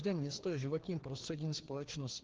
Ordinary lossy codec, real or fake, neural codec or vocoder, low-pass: Opus, 16 kbps; fake; codec, 16 kHz, 2 kbps, FreqCodec, larger model; 7.2 kHz